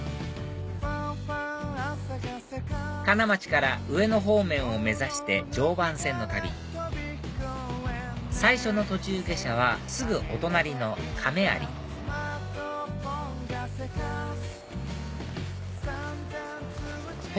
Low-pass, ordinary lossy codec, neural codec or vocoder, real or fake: none; none; none; real